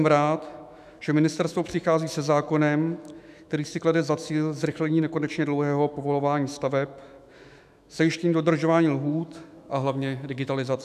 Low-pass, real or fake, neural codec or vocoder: 14.4 kHz; fake; autoencoder, 48 kHz, 128 numbers a frame, DAC-VAE, trained on Japanese speech